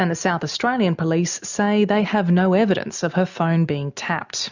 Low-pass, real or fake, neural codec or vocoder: 7.2 kHz; real; none